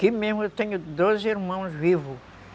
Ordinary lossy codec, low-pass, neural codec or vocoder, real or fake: none; none; none; real